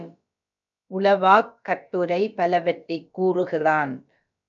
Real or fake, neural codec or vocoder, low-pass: fake; codec, 16 kHz, about 1 kbps, DyCAST, with the encoder's durations; 7.2 kHz